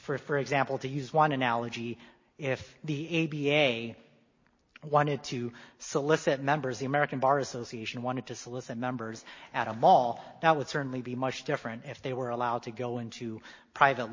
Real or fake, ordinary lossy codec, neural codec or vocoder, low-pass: real; MP3, 32 kbps; none; 7.2 kHz